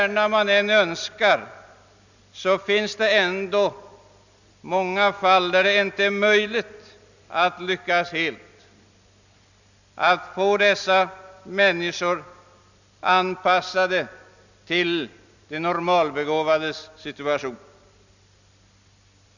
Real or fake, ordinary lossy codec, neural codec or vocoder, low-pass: real; none; none; 7.2 kHz